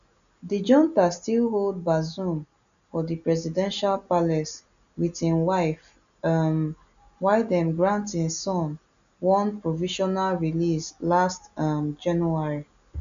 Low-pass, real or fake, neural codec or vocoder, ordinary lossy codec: 7.2 kHz; real; none; none